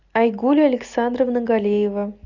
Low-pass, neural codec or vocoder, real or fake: 7.2 kHz; none; real